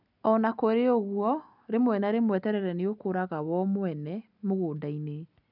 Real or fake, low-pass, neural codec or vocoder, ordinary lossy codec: real; 5.4 kHz; none; none